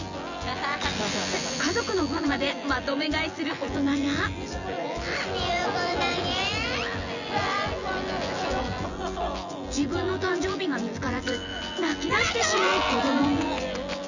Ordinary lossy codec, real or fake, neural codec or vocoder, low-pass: none; fake; vocoder, 24 kHz, 100 mel bands, Vocos; 7.2 kHz